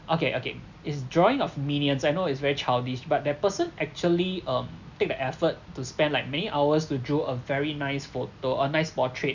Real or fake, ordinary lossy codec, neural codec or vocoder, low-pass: real; none; none; 7.2 kHz